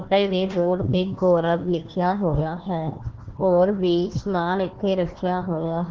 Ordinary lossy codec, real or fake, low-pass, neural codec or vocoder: Opus, 16 kbps; fake; 7.2 kHz; codec, 16 kHz, 1 kbps, FunCodec, trained on Chinese and English, 50 frames a second